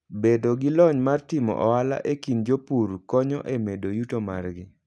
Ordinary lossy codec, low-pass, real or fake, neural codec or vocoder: none; none; real; none